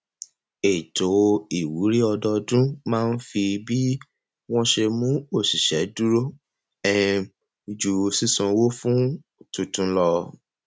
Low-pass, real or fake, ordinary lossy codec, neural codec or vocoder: none; real; none; none